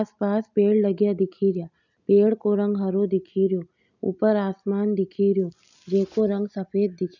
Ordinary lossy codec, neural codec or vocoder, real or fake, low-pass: none; none; real; 7.2 kHz